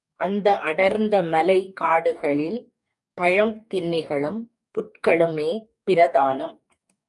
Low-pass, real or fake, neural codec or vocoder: 10.8 kHz; fake; codec, 44.1 kHz, 2.6 kbps, DAC